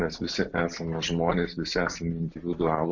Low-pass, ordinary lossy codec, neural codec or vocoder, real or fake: 7.2 kHz; MP3, 64 kbps; none; real